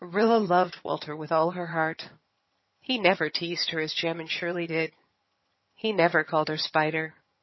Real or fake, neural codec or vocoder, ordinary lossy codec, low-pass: fake; vocoder, 22.05 kHz, 80 mel bands, HiFi-GAN; MP3, 24 kbps; 7.2 kHz